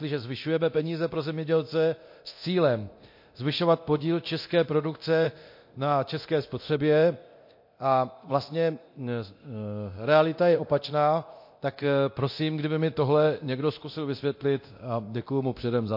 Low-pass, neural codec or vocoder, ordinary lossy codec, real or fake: 5.4 kHz; codec, 24 kHz, 0.9 kbps, DualCodec; MP3, 32 kbps; fake